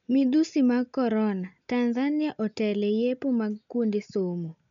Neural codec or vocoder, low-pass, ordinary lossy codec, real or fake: none; 7.2 kHz; none; real